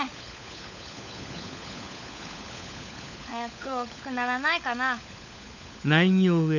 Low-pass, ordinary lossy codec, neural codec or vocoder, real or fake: 7.2 kHz; none; codec, 16 kHz, 16 kbps, FunCodec, trained on LibriTTS, 50 frames a second; fake